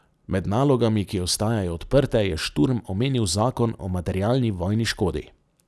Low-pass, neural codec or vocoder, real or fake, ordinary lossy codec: none; none; real; none